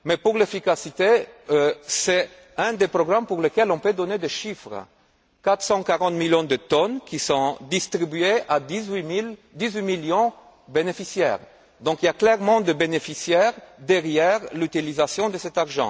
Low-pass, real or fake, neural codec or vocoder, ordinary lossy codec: none; real; none; none